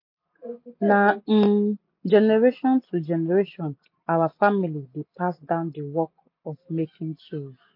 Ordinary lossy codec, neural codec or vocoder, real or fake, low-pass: MP3, 32 kbps; none; real; 5.4 kHz